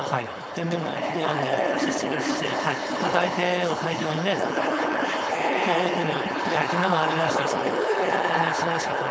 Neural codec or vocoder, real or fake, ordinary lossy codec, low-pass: codec, 16 kHz, 4.8 kbps, FACodec; fake; none; none